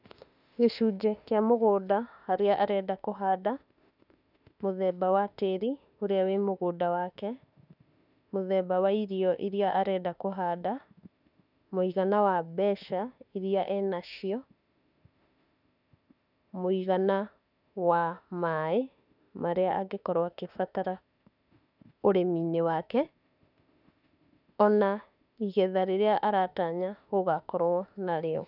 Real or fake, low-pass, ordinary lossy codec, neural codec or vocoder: fake; 5.4 kHz; none; autoencoder, 48 kHz, 32 numbers a frame, DAC-VAE, trained on Japanese speech